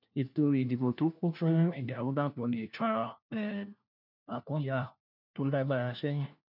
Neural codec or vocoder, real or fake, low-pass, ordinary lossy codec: codec, 16 kHz, 1 kbps, FunCodec, trained on LibriTTS, 50 frames a second; fake; 5.4 kHz; none